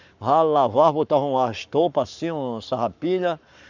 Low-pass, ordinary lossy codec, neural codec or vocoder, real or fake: 7.2 kHz; none; codec, 44.1 kHz, 7.8 kbps, Pupu-Codec; fake